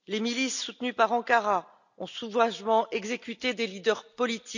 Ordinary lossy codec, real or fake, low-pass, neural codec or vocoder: none; real; 7.2 kHz; none